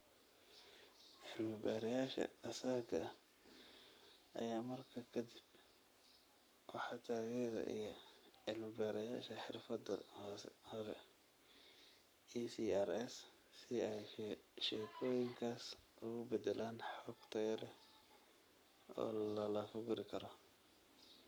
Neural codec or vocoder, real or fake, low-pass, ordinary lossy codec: codec, 44.1 kHz, 7.8 kbps, Pupu-Codec; fake; none; none